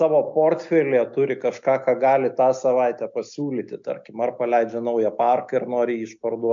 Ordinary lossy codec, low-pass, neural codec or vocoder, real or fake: MP3, 64 kbps; 7.2 kHz; none; real